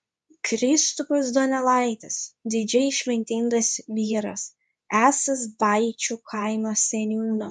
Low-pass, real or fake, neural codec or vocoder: 10.8 kHz; fake; codec, 24 kHz, 0.9 kbps, WavTokenizer, medium speech release version 2